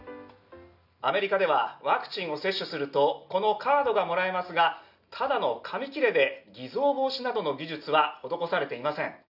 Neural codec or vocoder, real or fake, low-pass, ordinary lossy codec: none; real; 5.4 kHz; none